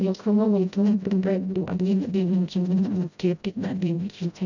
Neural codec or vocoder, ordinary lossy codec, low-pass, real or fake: codec, 16 kHz, 0.5 kbps, FreqCodec, smaller model; none; 7.2 kHz; fake